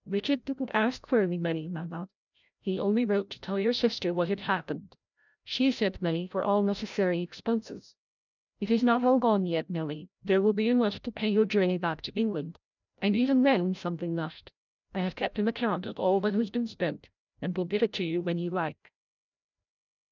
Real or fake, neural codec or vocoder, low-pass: fake; codec, 16 kHz, 0.5 kbps, FreqCodec, larger model; 7.2 kHz